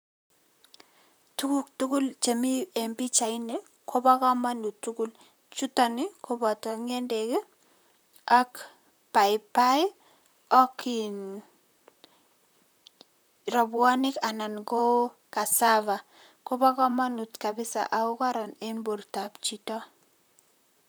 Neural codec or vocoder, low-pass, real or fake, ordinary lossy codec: vocoder, 44.1 kHz, 128 mel bands, Pupu-Vocoder; none; fake; none